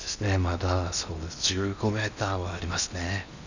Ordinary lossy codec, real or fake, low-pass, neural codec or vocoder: none; fake; 7.2 kHz; codec, 16 kHz in and 24 kHz out, 0.8 kbps, FocalCodec, streaming, 65536 codes